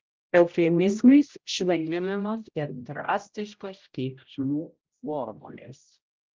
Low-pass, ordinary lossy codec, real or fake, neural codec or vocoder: 7.2 kHz; Opus, 24 kbps; fake; codec, 16 kHz, 0.5 kbps, X-Codec, HuBERT features, trained on general audio